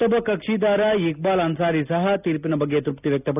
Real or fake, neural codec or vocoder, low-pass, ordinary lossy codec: real; none; 3.6 kHz; none